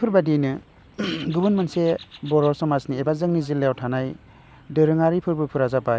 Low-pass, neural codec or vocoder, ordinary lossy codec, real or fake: none; none; none; real